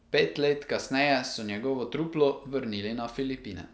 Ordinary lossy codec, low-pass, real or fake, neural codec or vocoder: none; none; real; none